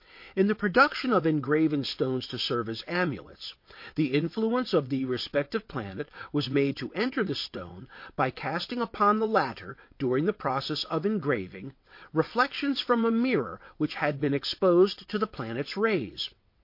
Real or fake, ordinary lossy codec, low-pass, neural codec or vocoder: fake; MP3, 32 kbps; 5.4 kHz; vocoder, 22.05 kHz, 80 mel bands, WaveNeXt